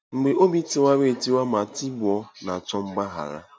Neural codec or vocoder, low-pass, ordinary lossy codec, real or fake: none; none; none; real